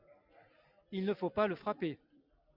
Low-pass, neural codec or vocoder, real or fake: 5.4 kHz; none; real